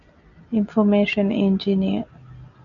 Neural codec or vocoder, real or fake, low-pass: none; real; 7.2 kHz